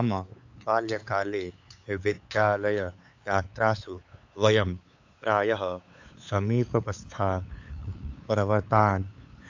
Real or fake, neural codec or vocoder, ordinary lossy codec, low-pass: fake; codec, 16 kHz, 8 kbps, FunCodec, trained on LibriTTS, 25 frames a second; AAC, 48 kbps; 7.2 kHz